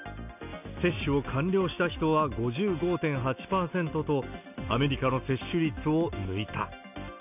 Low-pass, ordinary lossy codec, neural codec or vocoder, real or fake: 3.6 kHz; none; none; real